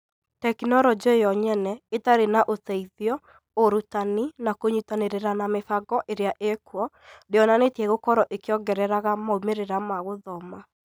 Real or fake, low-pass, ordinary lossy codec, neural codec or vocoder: real; none; none; none